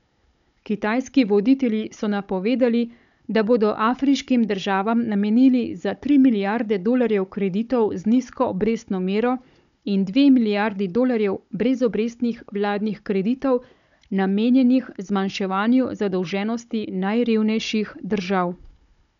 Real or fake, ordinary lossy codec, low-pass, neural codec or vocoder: fake; none; 7.2 kHz; codec, 16 kHz, 16 kbps, FunCodec, trained on Chinese and English, 50 frames a second